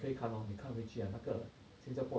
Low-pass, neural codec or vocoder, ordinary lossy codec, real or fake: none; none; none; real